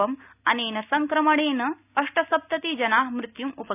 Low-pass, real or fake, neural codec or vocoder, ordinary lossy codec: 3.6 kHz; real; none; none